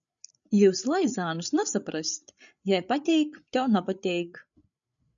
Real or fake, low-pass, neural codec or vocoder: fake; 7.2 kHz; codec, 16 kHz, 8 kbps, FreqCodec, larger model